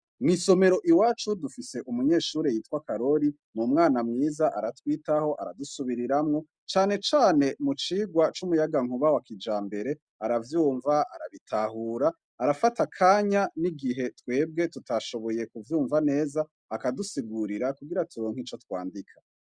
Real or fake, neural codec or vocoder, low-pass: real; none; 9.9 kHz